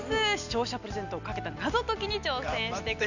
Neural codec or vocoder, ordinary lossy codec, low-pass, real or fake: none; none; 7.2 kHz; real